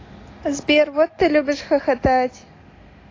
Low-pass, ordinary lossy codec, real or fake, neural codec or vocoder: 7.2 kHz; AAC, 32 kbps; real; none